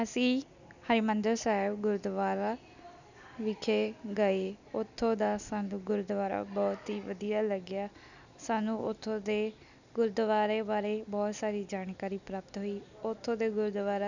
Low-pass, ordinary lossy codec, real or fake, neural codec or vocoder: 7.2 kHz; none; real; none